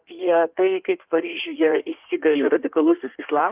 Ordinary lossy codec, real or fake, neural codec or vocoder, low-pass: Opus, 24 kbps; fake; codec, 16 kHz in and 24 kHz out, 1.1 kbps, FireRedTTS-2 codec; 3.6 kHz